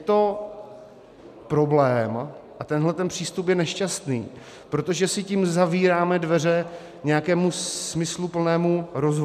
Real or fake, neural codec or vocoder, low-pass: real; none; 14.4 kHz